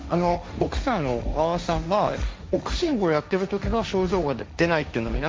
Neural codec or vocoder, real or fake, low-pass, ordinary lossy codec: codec, 16 kHz, 1.1 kbps, Voila-Tokenizer; fake; none; none